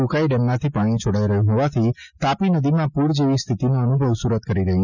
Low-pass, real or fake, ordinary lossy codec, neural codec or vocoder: 7.2 kHz; real; none; none